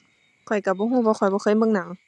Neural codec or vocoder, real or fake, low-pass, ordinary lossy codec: none; real; none; none